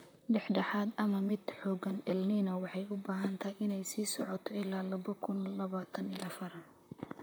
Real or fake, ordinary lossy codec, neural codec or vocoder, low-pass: fake; none; vocoder, 44.1 kHz, 128 mel bands, Pupu-Vocoder; none